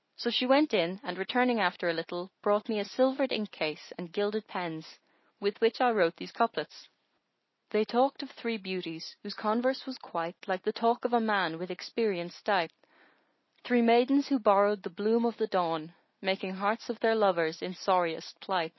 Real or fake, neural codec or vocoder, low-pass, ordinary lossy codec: real; none; 7.2 kHz; MP3, 24 kbps